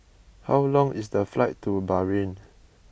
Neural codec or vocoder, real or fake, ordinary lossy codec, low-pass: none; real; none; none